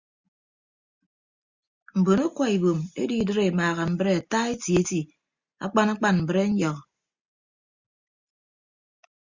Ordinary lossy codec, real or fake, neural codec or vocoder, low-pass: Opus, 64 kbps; real; none; 7.2 kHz